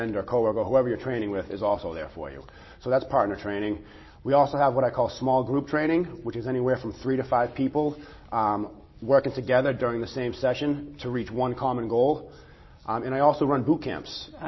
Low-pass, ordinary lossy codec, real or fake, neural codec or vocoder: 7.2 kHz; MP3, 24 kbps; real; none